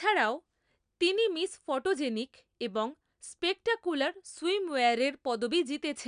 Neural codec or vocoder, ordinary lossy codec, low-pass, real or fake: none; none; 9.9 kHz; real